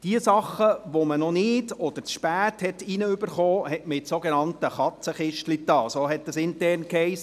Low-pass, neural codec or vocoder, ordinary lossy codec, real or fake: 14.4 kHz; none; none; real